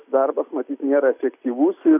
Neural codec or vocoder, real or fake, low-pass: none; real; 3.6 kHz